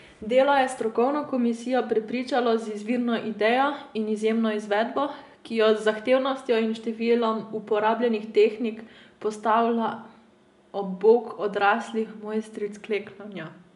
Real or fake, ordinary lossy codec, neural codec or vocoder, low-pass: real; none; none; 10.8 kHz